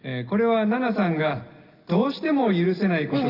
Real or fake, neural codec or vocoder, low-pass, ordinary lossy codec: real; none; 5.4 kHz; Opus, 24 kbps